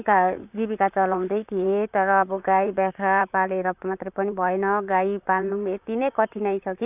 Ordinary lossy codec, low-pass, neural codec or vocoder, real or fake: none; 3.6 kHz; vocoder, 44.1 kHz, 128 mel bands, Pupu-Vocoder; fake